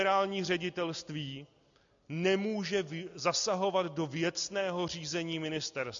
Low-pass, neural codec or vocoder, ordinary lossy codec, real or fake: 7.2 kHz; none; MP3, 64 kbps; real